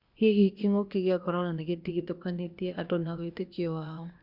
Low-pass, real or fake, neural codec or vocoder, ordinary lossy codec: 5.4 kHz; fake; codec, 16 kHz, 0.8 kbps, ZipCodec; Opus, 64 kbps